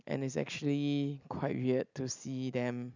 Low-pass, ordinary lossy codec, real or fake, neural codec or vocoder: 7.2 kHz; none; real; none